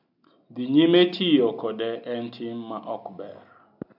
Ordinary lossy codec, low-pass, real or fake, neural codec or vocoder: MP3, 48 kbps; 5.4 kHz; real; none